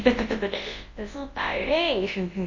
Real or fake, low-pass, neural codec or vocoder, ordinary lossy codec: fake; 7.2 kHz; codec, 24 kHz, 0.9 kbps, WavTokenizer, large speech release; MP3, 32 kbps